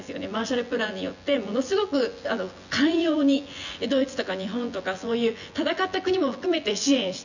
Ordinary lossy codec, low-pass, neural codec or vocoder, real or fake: none; 7.2 kHz; vocoder, 24 kHz, 100 mel bands, Vocos; fake